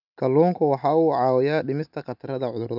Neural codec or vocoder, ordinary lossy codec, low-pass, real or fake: none; none; 5.4 kHz; real